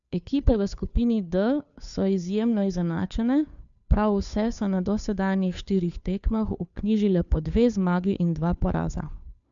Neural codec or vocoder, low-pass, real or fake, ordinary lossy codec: codec, 16 kHz, 2 kbps, FunCodec, trained on Chinese and English, 25 frames a second; 7.2 kHz; fake; MP3, 96 kbps